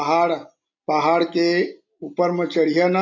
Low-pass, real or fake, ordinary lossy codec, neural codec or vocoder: 7.2 kHz; real; AAC, 48 kbps; none